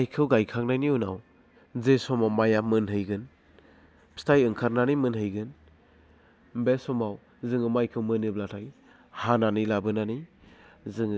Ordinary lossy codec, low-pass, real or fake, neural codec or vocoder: none; none; real; none